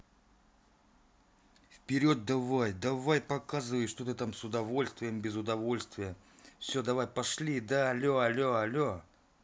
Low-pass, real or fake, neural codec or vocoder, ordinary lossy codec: none; real; none; none